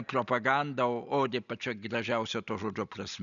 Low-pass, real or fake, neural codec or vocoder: 7.2 kHz; real; none